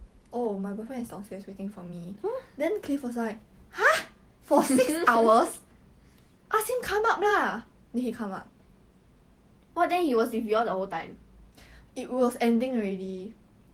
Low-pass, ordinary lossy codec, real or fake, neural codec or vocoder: 19.8 kHz; Opus, 24 kbps; fake; vocoder, 48 kHz, 128 mel bands, Vocos